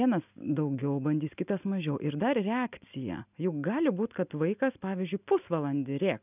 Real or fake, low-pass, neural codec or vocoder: real; 3.6 kHz; none